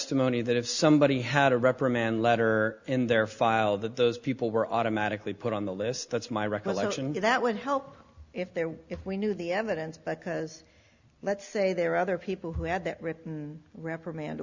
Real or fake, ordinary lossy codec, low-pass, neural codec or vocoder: real; Opus, 64 kbps; 7.2 kHz; none